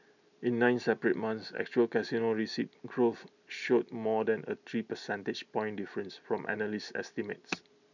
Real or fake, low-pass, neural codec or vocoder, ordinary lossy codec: real; 7.2 kHz; none; none